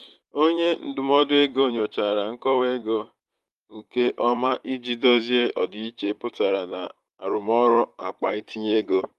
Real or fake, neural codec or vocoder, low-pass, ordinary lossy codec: fake; vocoder, 44.1 kHz, 128 mel bands, Pupu-Vocoder; 14.4 kHz; Opus, 32 kbps